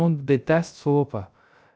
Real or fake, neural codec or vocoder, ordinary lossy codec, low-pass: fake; codec, 16 kHz, 0.3 kbps, FocalCodec; none; none